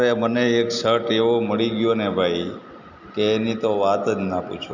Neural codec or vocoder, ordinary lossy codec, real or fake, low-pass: none; none; real; 7.2 kHz